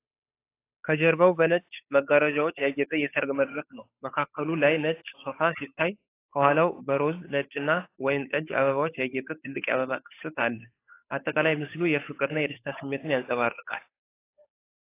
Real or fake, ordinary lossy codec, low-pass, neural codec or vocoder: fake; AAC, 24 kbps; 3.6 kHz; codec, 16 kHz, 8 kbps, FunCodec, trained on Chinese and English, 25 frames a second